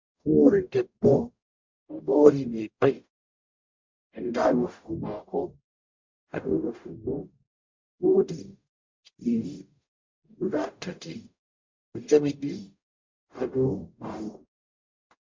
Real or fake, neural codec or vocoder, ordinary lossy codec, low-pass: fake; codec, 44.1 kHz, 0.9 kbps, DAC; MP3, 48 kbps; 7.2 kHz